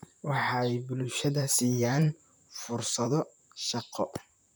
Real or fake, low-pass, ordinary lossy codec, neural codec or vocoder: fake; none; none; vocoder, 44.1 kHz, 128 mel bands, Pupu-Vocoder